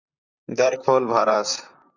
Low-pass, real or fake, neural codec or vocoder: 7.2 kHz; fake; vocoder, 44.1 kHz, 128 mel bands, Pupu-Vocoder